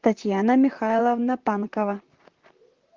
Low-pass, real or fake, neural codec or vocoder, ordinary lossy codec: 7.2 kHz; fake; vocoder, 44.1 kHz, 128 mel bands, Pupu-Vocoder; Opus, 16 kbps